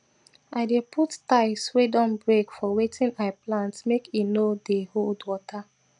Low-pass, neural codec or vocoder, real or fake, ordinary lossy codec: 9.9 kHz; none; real; none